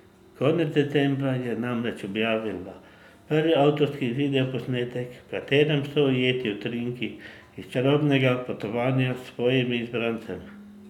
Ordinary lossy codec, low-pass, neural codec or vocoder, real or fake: none; 19.8 kHz; none; real